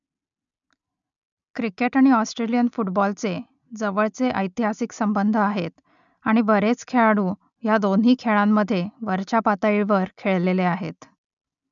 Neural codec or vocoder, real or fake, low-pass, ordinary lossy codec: none; real; 7.2 kHz; none